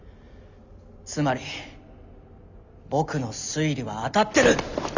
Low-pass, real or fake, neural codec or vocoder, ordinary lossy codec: 7.2 kHz; real; none; none